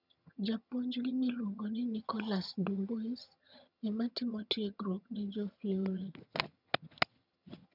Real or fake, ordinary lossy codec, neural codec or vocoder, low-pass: fake; none; vocoder, 22.05 kHz, 80 mel bands, HiFi-GAN; 5.4 kHz